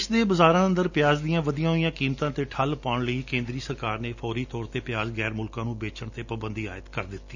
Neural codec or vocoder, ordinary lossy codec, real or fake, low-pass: none; none; real; 7.2 kHz